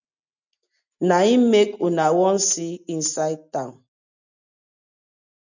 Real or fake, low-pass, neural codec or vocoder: real; 7.2 kHz; none